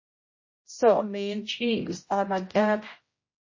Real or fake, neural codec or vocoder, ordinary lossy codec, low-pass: fake; codec, 16 kHz, 0.5 kbps, X-Codec, HuBERT features, trained on general audio; MP3, 32 kbps; 7.2 kHz